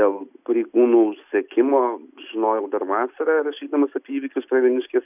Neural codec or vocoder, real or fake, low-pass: none; real; 3.6 kHz